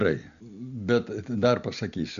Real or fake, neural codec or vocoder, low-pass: real; none; 7.2 kHz